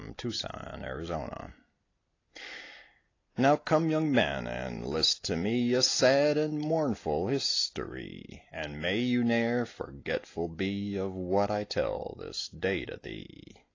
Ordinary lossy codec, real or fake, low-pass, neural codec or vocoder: AAC, 32 kbps; real; 7.2 kHz; none